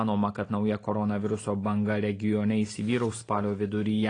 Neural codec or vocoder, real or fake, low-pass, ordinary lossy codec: none; real; 9.9 kHz; AAC, 32 kbps